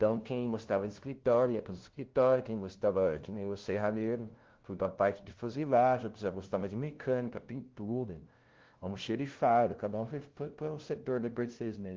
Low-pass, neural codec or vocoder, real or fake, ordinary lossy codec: 7.2 kHz; codec, 16 kHz, 0.5 kbps, FunCodec, trained on LibriTTS, 25 frames a second; fake; Opus, 16 kbps